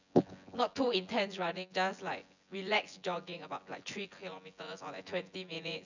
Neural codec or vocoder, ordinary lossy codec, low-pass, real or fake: vocoder, 24 kHz, 100 mel bands, Vocos; none; 7.2 kHz; fake